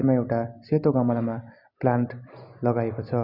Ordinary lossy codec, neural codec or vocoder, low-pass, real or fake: none; none; 5.4 kHz; real